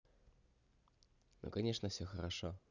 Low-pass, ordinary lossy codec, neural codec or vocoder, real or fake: 7.2 kHz; none; none; real